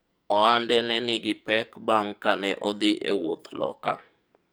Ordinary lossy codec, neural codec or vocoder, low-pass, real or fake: none; codec, 44.1 kHz, 2.6 kbps, SNAC; none; fake